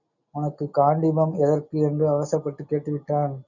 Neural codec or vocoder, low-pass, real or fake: none; 7.2 kHz; real